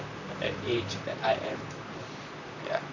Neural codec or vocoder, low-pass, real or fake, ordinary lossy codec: vocoder, 44.1 kHz, 128 mel bands, Pupu-Vocoder; 7.2 kHz; fake; none